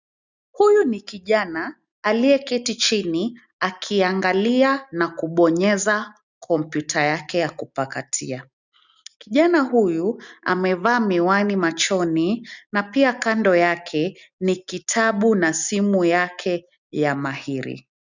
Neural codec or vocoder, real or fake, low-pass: none; real; 7.2 kHz